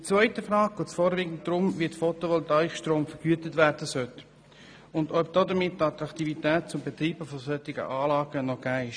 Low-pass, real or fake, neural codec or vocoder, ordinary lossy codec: 9.9 kHz; real; none; none